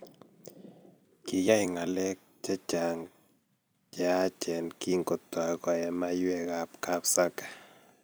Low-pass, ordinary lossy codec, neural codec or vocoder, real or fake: none; none; vocoder, 44.1 kHz, 128 mel bands every 256 samples, BigVGAN v2; fake